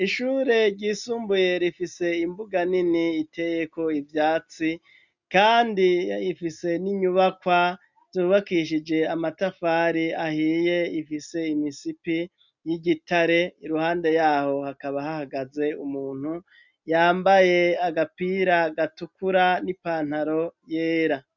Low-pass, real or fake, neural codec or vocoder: 7.2 kHz; real; none